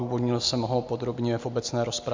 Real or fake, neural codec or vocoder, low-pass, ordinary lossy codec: real; none; 7.2 kHz; MP3, 64 kbps